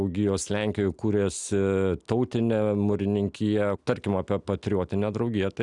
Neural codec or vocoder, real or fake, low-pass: none; real; 10.8 kHz